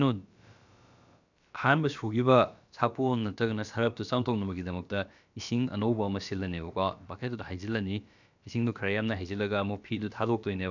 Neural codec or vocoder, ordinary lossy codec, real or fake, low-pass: codec, 16 kHz, about 1 kbps, DyCAST, with the encoder's durations; none; fake; 7.2 kHz